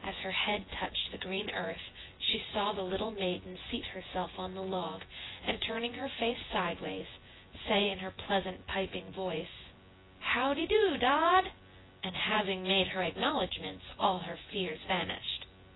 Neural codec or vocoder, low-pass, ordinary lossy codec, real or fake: vocoder, 24 kHz, 100 mel bands, Vocos; 7.2 kHz; AAC, 16 kbps; fake